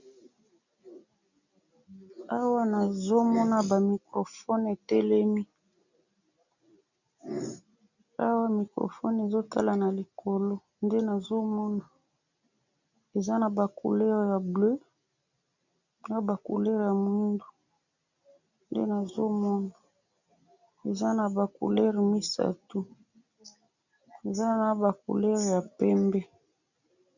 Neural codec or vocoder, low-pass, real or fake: none; 7.2 kHz; real